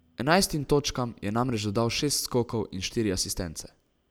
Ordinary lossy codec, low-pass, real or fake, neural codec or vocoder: none; none; real; none